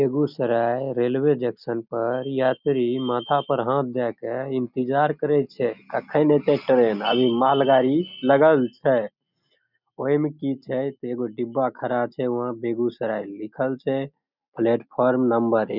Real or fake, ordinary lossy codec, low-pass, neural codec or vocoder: real; none; 5.4 kHz; none